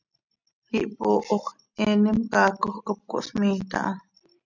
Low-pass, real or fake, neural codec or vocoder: 7.2 kHz; real; none